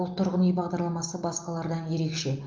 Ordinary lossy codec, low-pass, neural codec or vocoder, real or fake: Opus, 24 kbps; 7.2 kHz; none; real